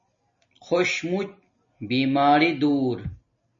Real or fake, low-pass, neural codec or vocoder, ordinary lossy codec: real; 7.2 kHz; none; MP3, 32 kbps